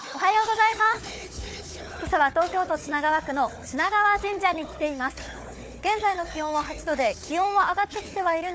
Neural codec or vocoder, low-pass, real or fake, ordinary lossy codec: codec, 16 kHz, 4 kbps, FunCodec, trained on Chinese and English, 50 frames a second; none; fake; none